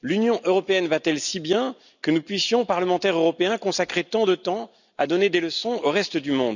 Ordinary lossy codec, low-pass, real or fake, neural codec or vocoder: none; 7.2 kHz; real; none